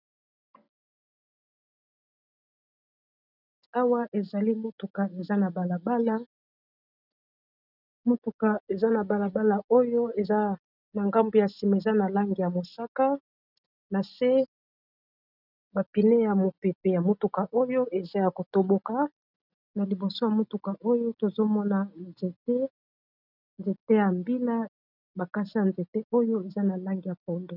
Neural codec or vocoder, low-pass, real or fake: none; 5.4 kHz; real